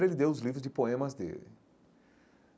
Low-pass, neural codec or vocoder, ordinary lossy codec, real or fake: none; none; none; real